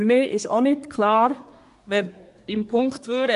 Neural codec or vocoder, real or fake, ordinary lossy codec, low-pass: codec, 24 kHz, 1 kbps, SNAC; fake; MP3, 64 kbps; 10.8 kHz